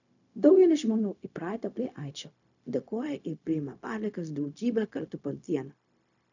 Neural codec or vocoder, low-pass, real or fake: codec, 16 kHz, 0.4 kbps, LongCat-Audio-Codec; 7.2 kHz; fake